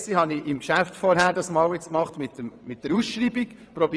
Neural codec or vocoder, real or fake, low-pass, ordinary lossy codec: vocoder, 22.05 kHz, 80 mel bands, WaveNeXt; fake; none; none